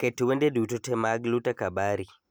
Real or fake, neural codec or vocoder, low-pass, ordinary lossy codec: real; none; none; none